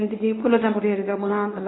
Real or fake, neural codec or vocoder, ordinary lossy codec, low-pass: fake; codec, 24 kHz, 0.9 kbps, WavTokenizer, medium speech release version 1; AAC, 16 kbps; 7.2 kHz